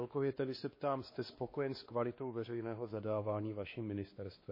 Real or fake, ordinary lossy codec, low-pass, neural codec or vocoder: fake; MP3, 24 kbps; 5.4 kHz; codec, 16 kHz, 2 kbps, X-Codec, WavLM features, trained on Multilingual LibriSpeech